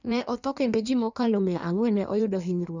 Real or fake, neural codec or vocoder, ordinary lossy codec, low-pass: fake; codec, 16 kHz in and 24 kHz out, 1.1 kbps, FireRedTTS-2 codec; none; 7.2 kHz